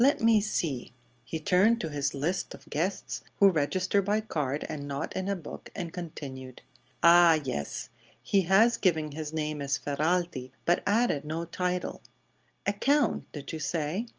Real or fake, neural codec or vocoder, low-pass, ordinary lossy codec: real; none; 7.2 kHz; Opus, 24 kbps